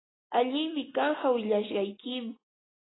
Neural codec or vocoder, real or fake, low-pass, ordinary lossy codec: none; real; 7.2 kHz; AAC, 16 kbps